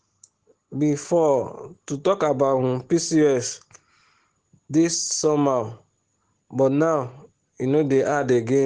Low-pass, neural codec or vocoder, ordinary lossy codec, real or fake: 9.9 kHz; none; Opus, 16 kbps; real